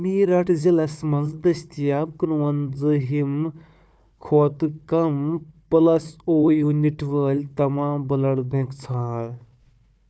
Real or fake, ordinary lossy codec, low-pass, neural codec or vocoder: fake; none; none; codec, 16 kHz, 4 kbps, FunCodec, trained on Chinese and English, 50 frames a second